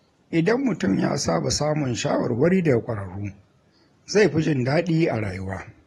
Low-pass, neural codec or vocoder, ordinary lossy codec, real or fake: 19.8 kHz; vocoder, 44.1 kHz, 128 mel bands every 512 samples, BigVGAN v2; AAC, 32 kbps; fake